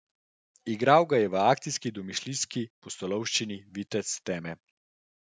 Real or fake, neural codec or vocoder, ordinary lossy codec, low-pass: real; none; none; none